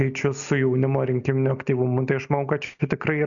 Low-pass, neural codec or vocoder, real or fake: 7.2 kHz; none; real